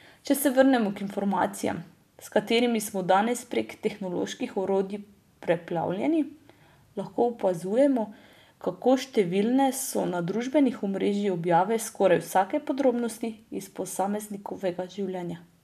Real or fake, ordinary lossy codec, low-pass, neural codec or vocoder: real; none; 14.4 kHz; none